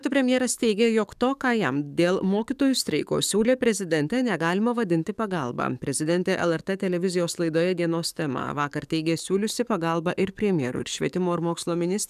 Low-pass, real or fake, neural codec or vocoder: 14.4 kHz; fake; codec, 44.1 kHz, 7.8 kbps, DAC